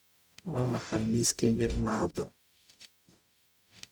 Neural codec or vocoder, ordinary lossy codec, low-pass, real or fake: codec, 44.1 kHz, 0.9 kbps, DAC; none; none; fake